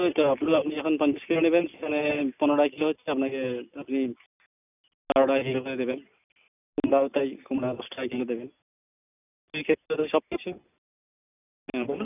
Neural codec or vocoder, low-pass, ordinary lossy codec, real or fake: none; 3.6 kHz; none; real